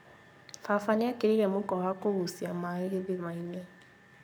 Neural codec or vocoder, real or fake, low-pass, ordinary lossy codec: codec, 44.1 kHz, 7.8 kbps, Pupu-Codec; fake; none; none